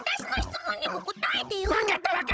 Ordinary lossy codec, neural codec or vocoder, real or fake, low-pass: none; codec, 16 kHz, 16 kbps, FunCodec, trained on Chinese and English, 50 frames a second; fake; none